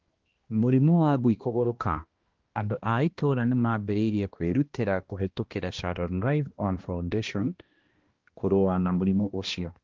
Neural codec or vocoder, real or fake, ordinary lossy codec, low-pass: codec, 16 kHz, 1 kbps, X-Codec, HuBERT features, trained on balanced general audio; fake; Opus, 16 kbps; 7.2 kHz